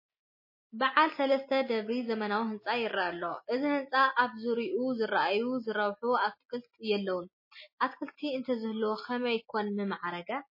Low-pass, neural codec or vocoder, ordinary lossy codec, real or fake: 5.4 kHz; none; MP3, 24 kbps; real